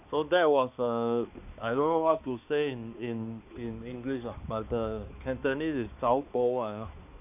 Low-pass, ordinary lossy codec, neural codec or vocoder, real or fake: 3.6 kHz; none; codec, 16 kHz, 2 kbps, X-Codec, HuBERT features, trained on balanced general audio; fake